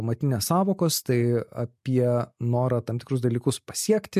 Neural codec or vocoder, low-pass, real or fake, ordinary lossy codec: none; 14.4 kHz; real; MP3, 64 kbps